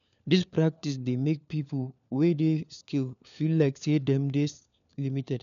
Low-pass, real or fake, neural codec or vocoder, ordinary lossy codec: 7.2 kHz; fake; codec, 16 kHz, 4 kbps, FunCodec, trained on LibriTTS, 50 frames a second; none